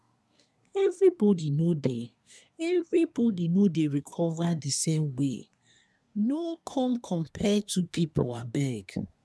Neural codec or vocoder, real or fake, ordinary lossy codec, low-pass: codec, 24 kHz, 1 kbps, SNAC; fake; none; none